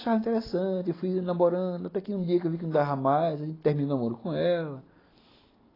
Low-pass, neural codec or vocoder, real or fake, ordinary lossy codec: 5.4 kHz; none; real; AAC, 24 kbps